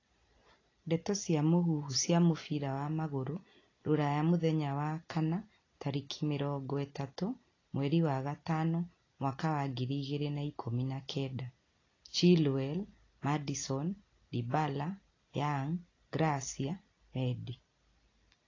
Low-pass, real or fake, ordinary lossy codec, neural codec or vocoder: 7.2 kHz; real; AAC, 32 kbps; none